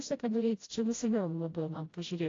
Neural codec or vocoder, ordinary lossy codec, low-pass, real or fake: codec, 16 kHz, 0.5 kbps, FreqCodec, smaller model; AAC, 32 kbps; 7.2 kHz; fake